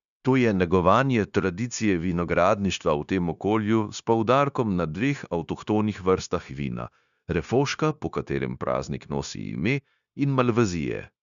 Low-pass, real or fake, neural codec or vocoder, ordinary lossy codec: 7.2 kHz; fake; codec, 16 kHz, 0.9 kbps, LongCat-Audio-Codec; none